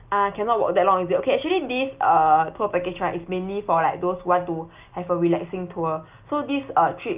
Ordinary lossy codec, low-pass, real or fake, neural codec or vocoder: Opus, 24 kbps; 3.6 kHz; real; none